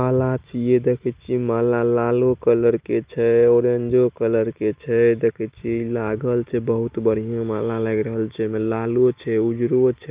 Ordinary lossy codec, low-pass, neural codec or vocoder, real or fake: Opus, 32 kbps; 3.6 kHz; none; real